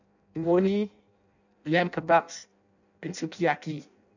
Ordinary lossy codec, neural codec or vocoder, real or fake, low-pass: none; codec, 16 kHz in and 24 kHz out, 0.6 kbps, FireRedTTS-2 codec; fake; 7.2 kHz